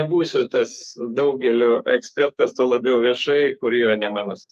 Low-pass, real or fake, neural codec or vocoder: 14.4 kHz; fake; codec, 44.1 kHz, 2.6 kbps, SNAC